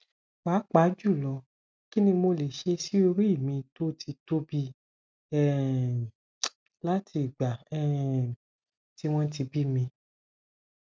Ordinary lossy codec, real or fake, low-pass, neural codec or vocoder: none; real; none; none